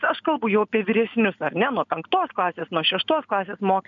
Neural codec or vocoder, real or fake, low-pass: none; real; 7.2 kHz